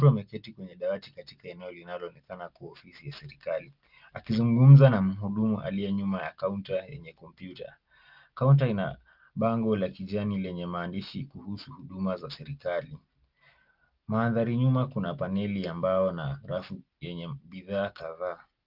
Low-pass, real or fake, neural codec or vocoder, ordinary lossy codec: 5.4 kHz; real; none; Opus, 32 kbps